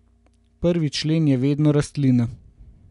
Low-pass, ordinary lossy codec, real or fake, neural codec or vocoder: 10.8 kHz; none; real; none